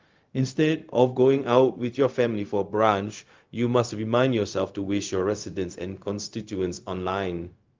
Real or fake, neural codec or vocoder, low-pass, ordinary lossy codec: fake; codec, 16 kHz, 0.4 kbps, LongCat-Audio-Codec; 7.2 kHz; Opus, 32 kbps